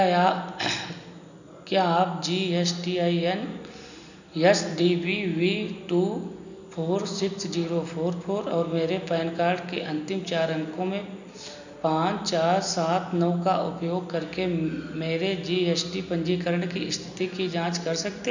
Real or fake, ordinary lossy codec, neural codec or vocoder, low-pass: real; none; none; 7.2 kHz